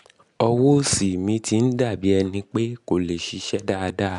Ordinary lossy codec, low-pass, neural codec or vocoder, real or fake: none; 10.8 kHz; none; real